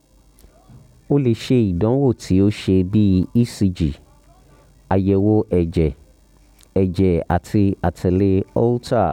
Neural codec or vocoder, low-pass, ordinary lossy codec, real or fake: none; 19.8 kHz; none; real